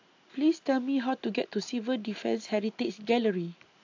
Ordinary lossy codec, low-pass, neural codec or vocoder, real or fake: AAC, 48 kbps; 7.2 kHz; none; real